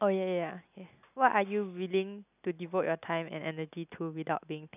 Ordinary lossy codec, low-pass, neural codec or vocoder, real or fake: none; 3.6 kHz; none; real